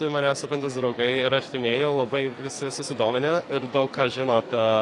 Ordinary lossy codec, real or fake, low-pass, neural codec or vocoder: AAC, 48 kbps; fake; 10.8 kHz; codec, 44.1 kHz, 2.6 kbps, SNAC